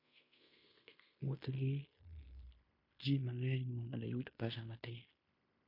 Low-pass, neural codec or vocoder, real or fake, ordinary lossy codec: 5.4 kHz; codec, 16 kHz in and 24 kHz out, 0.9 kbps, LongCat-Audio-Codec, fine tuned four codebook decoder; fake; MP3, 32 kbps